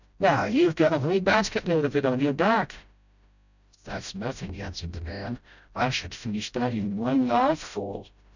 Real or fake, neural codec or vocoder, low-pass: fake; codec, 16 kHz, 0.5 kbps, FreqCodec, smaller model; 7.2 kHz